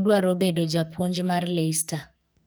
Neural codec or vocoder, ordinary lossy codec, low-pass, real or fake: codec, 44.1 kHz, 2.6 kbps, SNAC; none; none; fake